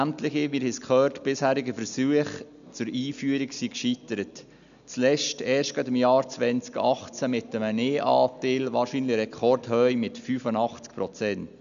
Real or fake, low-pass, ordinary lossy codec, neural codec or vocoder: real; 7.2 kHz; none; none